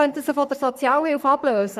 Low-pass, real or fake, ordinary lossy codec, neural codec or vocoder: 14.4 kHz; fake; none; codec, 44.1 kHz, 3.4 kbps, Pupu-Codec